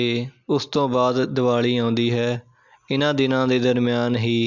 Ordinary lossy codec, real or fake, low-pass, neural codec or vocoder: MP3, 64 kbps; real; 7.2 kHz; none